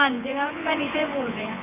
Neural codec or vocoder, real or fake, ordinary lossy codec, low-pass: vocoder, 24 kHz, 100 mel bands, Vocos; fake; none; 3.6 kHz